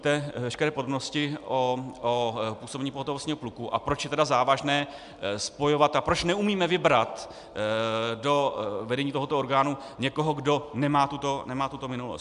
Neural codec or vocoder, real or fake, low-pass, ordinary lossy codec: none; real; 10.8 kHz; MP3, 96 kbps